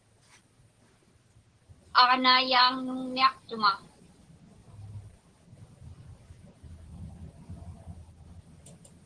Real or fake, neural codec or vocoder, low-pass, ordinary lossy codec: real; none; 9.9 kHz; Opus, 16 kbps